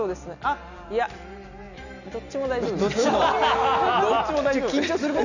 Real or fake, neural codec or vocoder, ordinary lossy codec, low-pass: real; none; none; 7.2 kHz